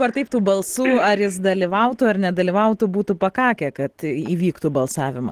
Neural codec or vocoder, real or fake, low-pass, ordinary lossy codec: none; real; 14.4 kHz; Opus, 16 kbps